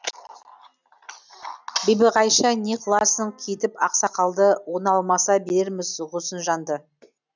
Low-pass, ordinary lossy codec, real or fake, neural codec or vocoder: 7.2 kHz; none; real; none